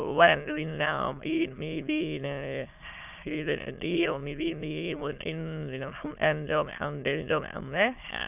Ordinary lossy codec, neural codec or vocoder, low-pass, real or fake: none; autoencoder, 22.05 kHz, a latent of 192 numbers a frame, VITS, trained on many speakers; 3.6 kHz; fake